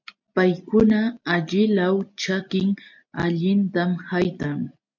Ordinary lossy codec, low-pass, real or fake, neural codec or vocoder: MP3, 64 kbps; 7.2 kHz; real; none